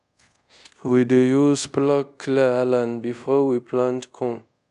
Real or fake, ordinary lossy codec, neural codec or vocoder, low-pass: fake; none; codec, 24 kHz, 0.5 kbps, DualCodec; 10.8 kHz